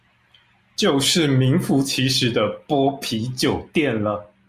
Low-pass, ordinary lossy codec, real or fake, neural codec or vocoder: 14.4 kHz; Opus, 64 kbps; real; none